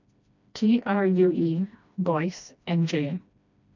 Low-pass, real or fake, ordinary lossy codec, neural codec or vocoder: 7.2 kHz; fake; none; codec, 16 kHz, 1 kbps, FreqCodec, smaller model